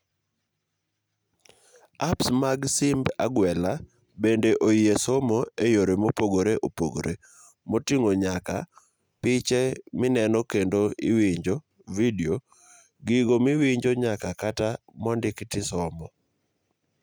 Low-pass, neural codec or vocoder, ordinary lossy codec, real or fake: none; none; none; real